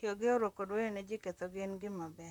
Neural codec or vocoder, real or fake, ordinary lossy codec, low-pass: vocoder, 44.1 kHz, 128 mel bands, Pupu-Vocoder; fake; none; 19.8 kHz